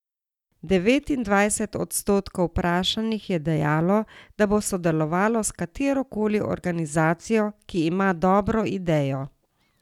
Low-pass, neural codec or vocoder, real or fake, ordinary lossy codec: 19.8 kHz; none; real; none